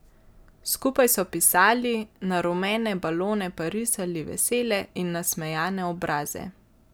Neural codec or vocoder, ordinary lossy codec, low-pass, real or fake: none; none; none; real